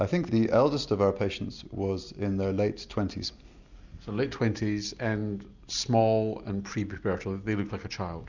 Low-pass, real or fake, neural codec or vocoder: 7.2 kHz; real; none